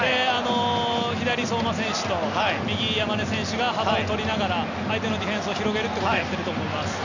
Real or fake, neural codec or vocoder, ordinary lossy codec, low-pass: real; none; none; 7.2 kHz